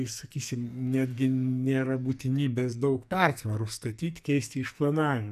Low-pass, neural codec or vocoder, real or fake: 14.4 kHz; codec, 44.1 kHz, 2.6 kbps, SNAC; fake